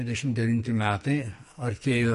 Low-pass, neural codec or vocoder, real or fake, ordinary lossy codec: 14.4 kHz; codec, 44.1 kHz, 2.6 kbps, SNAC; fake; MP3, 48 kbps